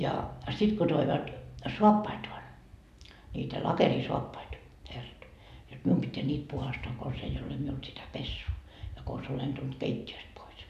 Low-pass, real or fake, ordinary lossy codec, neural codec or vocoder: 14.4 kHz; real; none; none